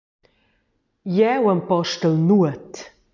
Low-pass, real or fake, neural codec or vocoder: 7.2 kHz; real; none